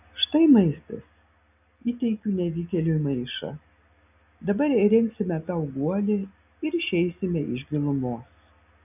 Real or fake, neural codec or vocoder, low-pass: real; none; 3.6 kHz